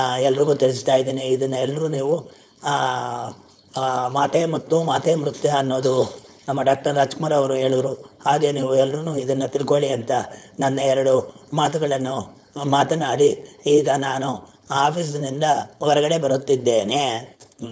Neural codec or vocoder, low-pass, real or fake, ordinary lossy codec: codec, 16 kHz, 4.8 kbps, FACodec; none; fake; none